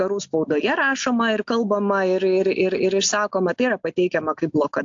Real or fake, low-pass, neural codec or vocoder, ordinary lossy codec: real; 7.2 kHz; none; AAC, 64 kbps